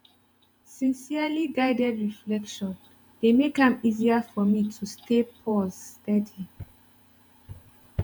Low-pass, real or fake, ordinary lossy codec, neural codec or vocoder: none; fake; none; vocoder, 48 kHz, 128 mel bands, Vocos